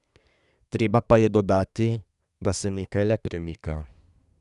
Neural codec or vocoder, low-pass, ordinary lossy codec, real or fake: codec, 24 kHz, 1 kbps, SNAC; 10.8 kHz; none; fake